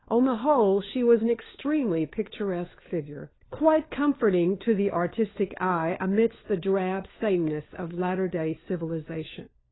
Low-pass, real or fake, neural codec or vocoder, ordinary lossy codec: 7.2 kHz; fake; codec, 16 kHz, 4 kbps, FunCodec, trained on LibriTTS, 50 frames a second; AAC, 16 kbps